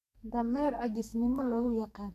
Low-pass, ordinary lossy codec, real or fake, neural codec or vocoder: 14.4 kHz; none; fake; codec, 44.1 kHz, 2.6 kbps, SNAC